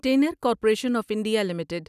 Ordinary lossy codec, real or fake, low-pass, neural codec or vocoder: none; real; 14.4 kHz; none